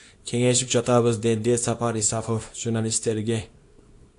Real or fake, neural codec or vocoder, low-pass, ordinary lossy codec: fake; codec, 24 kHz, 0.9 kbps, WavTokenizer, small release; 10.8 kHz; AAC, 48 kbps